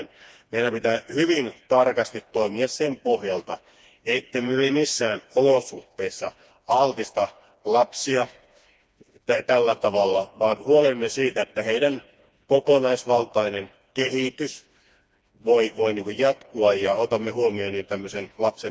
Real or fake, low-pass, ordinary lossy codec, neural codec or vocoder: fake; none; none; codec, 16 kHz, 2 kbps, FreqCodec, smaller model